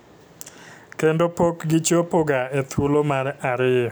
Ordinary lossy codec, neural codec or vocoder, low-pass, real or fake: none; none; none; real